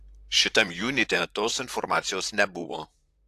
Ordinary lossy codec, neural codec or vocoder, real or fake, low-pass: AAC, 64 kbps; vocoder, 44.1 kHz, 128 mel bands, Pupu-Vocoder; fake; 14.4 kHz